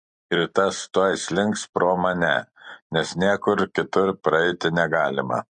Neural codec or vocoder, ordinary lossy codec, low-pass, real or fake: none; MP3, 48 kbps; 9.9 kHz; real